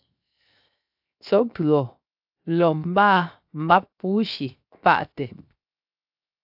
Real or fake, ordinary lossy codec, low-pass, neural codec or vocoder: fake; AAC, 48 kbps; 5.4 kHz; codec, 16 kHz, 0.7 kbps, FocalCodec